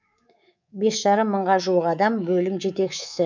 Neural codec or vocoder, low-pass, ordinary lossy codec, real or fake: codec, 24 kHz, 3.1 kbps, DualCodec; 7.2 kHz; none; fake